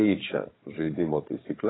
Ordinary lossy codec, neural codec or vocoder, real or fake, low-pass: AAC, 16 kbps; codec, 16 kHz, 4 kbps, FunCodec, trained on Chinese and English, 50 frames a second; fake; 7.2 kHz